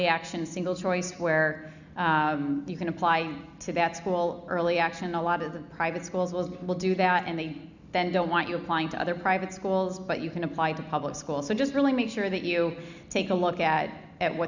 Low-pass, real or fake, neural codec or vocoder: 7.2 kHz; real; none